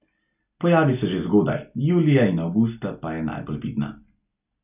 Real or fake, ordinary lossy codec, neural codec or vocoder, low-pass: real; none; none; 3.6 kHz